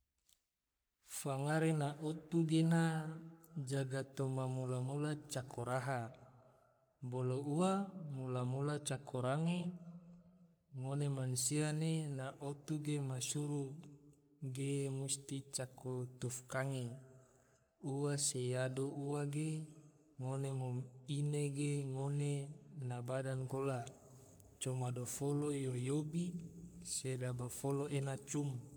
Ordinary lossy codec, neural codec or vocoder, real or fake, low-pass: none; codec, 44.1 kHz, 3.4 kbps, Pupu-Codec; fake; none